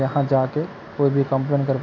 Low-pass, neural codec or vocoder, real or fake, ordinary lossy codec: 7.2 kHz; none; real; none